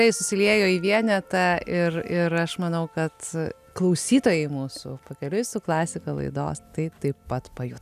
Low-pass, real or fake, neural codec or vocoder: 14.4 kHz; real; none